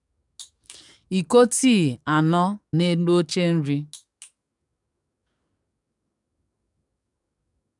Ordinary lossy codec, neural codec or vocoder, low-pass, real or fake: none; codec, 44.1 kHz, 7.8 kbps, DAC; 10.8 kHz; fake